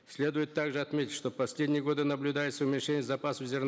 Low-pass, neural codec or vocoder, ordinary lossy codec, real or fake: none; none; none; real